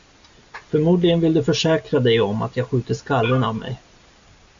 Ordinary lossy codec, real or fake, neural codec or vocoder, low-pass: MP3, 64 kbps; real; none; 7.2 kHz